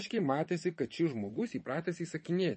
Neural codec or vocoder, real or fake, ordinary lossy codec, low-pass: vocoder, 22.05 kHz, 80 mel bands, WaveNeXt; fake; MP3, 32 kbps; 9.9 kHz